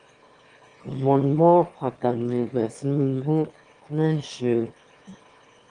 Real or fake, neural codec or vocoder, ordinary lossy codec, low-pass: fake; autoencoder, 22.05 kHz, a latent of 192 numbers a frame, VITS, trained on one speaker; Opus, 24 kbps; 9.9 kHz